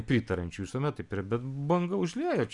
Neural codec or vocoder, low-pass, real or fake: none; 10.8 kHz; real